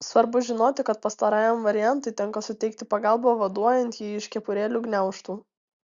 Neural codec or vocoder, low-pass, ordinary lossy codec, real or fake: none; 7.2 kHz; Opus, 64 kbps; real